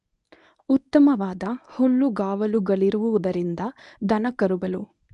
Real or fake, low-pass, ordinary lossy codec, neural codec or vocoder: fake; 10.8 kHz; none; codec, 24 kHz, 0.9 kbps, WavTokenizer, medium speech release version 1